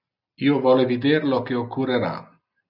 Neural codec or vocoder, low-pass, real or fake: none; 5.4 kHz; real